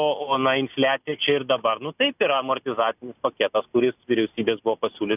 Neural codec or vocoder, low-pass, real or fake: none; 3.6 kHz; real